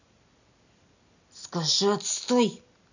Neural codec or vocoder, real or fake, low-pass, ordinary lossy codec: none; real; 7.2 kHz; none